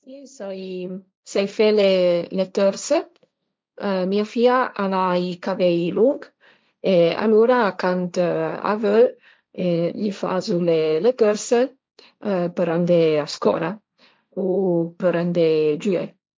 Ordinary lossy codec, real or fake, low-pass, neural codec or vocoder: none; fake; none; codec, 16 kHz, 1.1 kbps, Voila-Tokenizer